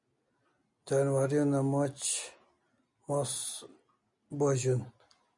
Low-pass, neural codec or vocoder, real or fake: 10.8 kHz; none; real